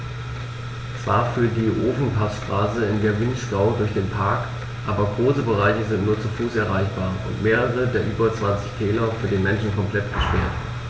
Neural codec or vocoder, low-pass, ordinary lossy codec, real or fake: none; none; none; real